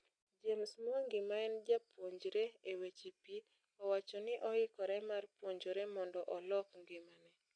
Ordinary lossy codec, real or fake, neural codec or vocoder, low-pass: AAC, 64 kbps; real; none; 9.9 kHz